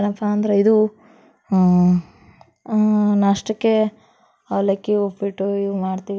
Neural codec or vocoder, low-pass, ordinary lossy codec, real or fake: none; none; none; real